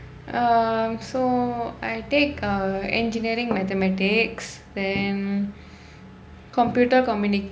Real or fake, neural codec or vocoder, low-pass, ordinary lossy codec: real; none; none; none